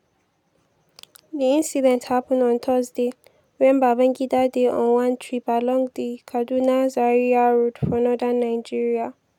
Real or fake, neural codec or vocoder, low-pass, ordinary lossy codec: real; none; 19.8 kHz; none